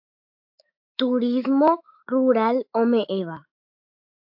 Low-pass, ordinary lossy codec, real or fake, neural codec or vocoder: 5.4 kHz; AAC, 48 kbps; fake; autoencoder, 48 kHz, 128 numbers a frame, DAC-VAE, trained on Japanese speech